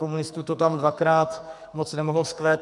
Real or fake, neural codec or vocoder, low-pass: fake; codec, 44.1 kHz, 2.6 kbps, SNAC; 10.8 kHz